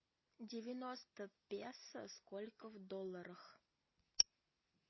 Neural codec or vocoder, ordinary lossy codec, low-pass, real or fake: none; MP3, 24 kbps; 7.2 kHz; real